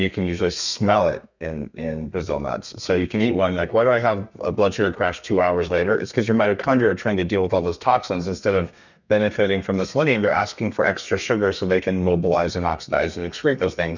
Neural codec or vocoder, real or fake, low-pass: codec, 32 kHz, 1.9 kbps, SNAC; fake; 7.2 kHz